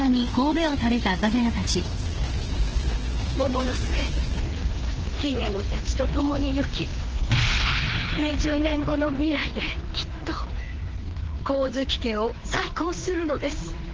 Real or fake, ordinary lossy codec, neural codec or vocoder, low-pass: fake; Opus, 16 kbps; codec, 16 kHz, 2 kbps, FreqCodec, larger model; 7.2 kHz